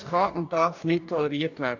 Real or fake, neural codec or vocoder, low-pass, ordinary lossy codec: fake; codec, 44.1 kHz, 2.6 kbps, DAC; 7.2 kHz; none